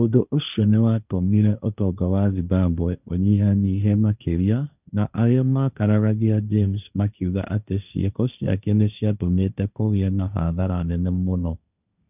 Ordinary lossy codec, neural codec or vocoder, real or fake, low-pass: none; codec, 16 kHz, 1.1 kbps, Voila-Tokenizer; fake; 3.6 kHz